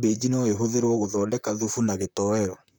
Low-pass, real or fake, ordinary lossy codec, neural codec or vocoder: none; fake; none; vocoder, 44.1 kHz, 128 mel bands, Pupu-Vocoder